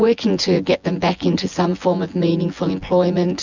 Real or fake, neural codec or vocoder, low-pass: fake; vocoder, 24 kHz, 100 mel bands, Vocos; 7.2 kHz